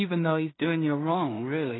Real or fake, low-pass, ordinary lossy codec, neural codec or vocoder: fake; 7.2 kHz; AAC, 16 kbps; codec, 16 kHz in and 24 kHz out, 0.4 kbps, LongCat-Audio-Codec, two codebook decoder